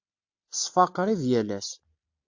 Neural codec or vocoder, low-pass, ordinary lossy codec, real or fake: none; 7.2 kHz; MP3, 48 kbps; real